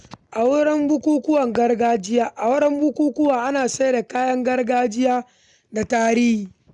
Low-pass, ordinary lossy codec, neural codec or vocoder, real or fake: 10.8 kHz; none; none; real